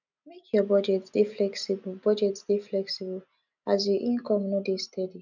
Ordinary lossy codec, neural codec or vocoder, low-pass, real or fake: none; none; 7.2 kHz; real